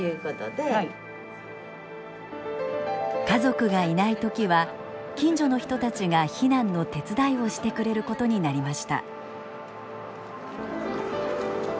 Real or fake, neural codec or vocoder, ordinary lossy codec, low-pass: real; none; none; none